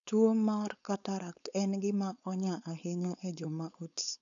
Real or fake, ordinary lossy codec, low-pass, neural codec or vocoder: fake; none; 7.2 kHz; codec, 16 kHz, 4.8 kbps, FACodec